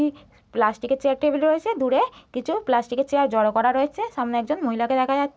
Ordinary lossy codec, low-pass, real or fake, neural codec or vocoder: none; none; real; none